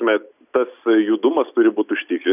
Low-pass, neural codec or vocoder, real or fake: 3.6 kHz; none; real